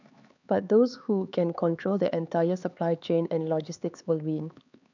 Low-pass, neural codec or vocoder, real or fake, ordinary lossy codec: 7.2 kHz; codec, 16 kHz, 4 kbps, X-Codec, HuBERT features, trained on LibriSpeech; fake; none